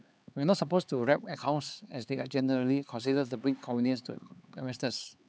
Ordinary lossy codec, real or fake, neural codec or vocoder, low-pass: none; fake; codec, 16 kHz, 4 kbps, X-Codec, HuBERT features, trained on LibriSpeech; none